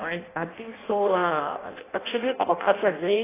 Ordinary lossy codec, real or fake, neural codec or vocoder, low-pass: AAC, 16 kbps; fake; codec, 16 kHz in and 24 kHz out, 0.6 kbps, FireRedTTS-2 codec; 3.6 kHz